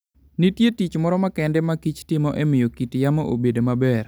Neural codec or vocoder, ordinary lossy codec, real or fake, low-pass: none; none; real; none